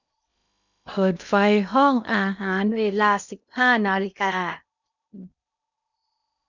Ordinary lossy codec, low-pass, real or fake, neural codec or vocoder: none; 7.2 kHz; fake; codec, 16 kHz in and 24 kHz out, 0.8 kbps, FocalCodec, streaming, 65536 codes